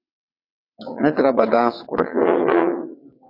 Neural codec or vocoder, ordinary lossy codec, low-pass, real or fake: vocoder, 22.05 kHz, 80 mel bands, WaveNeXt; MP3, 32 kbps; 5.4 kHz; fake